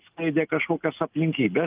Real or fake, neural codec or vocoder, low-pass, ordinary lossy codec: real; none; 3.6 kHz; Opus, 32 kbps